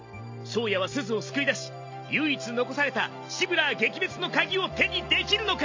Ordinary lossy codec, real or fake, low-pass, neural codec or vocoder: MP3, 48 kbps; real; 7.2 kHz; none